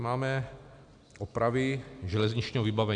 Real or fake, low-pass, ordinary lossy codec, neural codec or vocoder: real; 9.9 kHz; AAC, 64 kbps; none